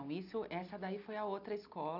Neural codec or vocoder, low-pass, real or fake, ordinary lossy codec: none; 5.4 kHz; real; none